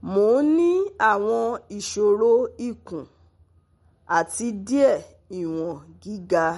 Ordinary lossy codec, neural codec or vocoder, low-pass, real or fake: MP3, 48 kbps; none; 19.8 kHz; real